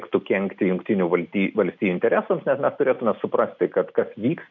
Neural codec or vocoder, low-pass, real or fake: none; 7.2 kHz; real